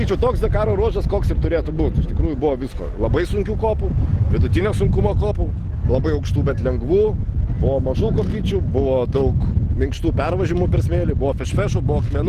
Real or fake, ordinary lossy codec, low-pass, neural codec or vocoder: real; Opus, 16 kbps; 14.4 kHz; none